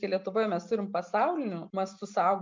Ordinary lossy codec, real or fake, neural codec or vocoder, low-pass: MP3, 64 kbps; real; none; 7.2 kHz